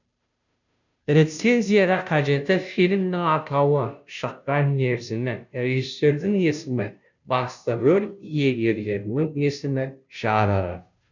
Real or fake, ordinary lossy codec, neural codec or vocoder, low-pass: fake; none; codec, 16 kHz, 0.5 kbps, FunCodec, trained on Chinese and English, 25 frames a second; 7.2 kHz